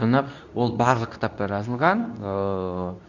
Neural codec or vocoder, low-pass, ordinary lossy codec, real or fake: codec, 24 kHz, 0.9 kbps, WavTokenizer, medium speech release version 2; 7.2 kHz; none; fake